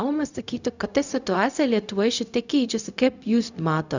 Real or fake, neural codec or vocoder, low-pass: fake; codec, 16 kHz, 0.4 kbps, LongCat-Audio-Codec; 7.2 kHz